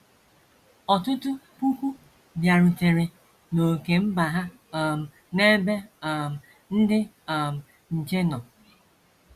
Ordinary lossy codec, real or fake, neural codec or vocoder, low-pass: Opus, 64 kbps; real; none; 14.4 kHz